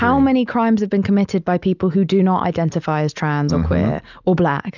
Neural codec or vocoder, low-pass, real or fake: none; 7.2 kHz; real